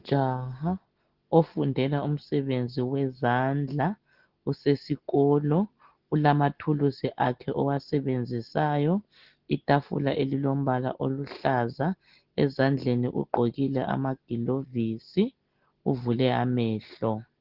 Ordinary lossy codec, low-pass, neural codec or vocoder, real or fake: Opus, 16 kbps; 5.4 kHz; none; real